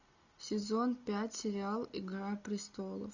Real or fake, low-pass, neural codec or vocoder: real; 7.2 kHz; none